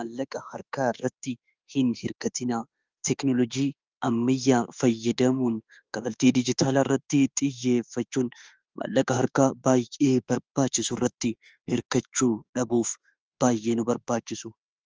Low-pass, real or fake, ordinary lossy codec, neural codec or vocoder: 7.2 kHz; fake; Opus, 24 kbps; autoencoder, 48 kHz, 32 numbers a frame, DAC-VAE, trained on Japanese speech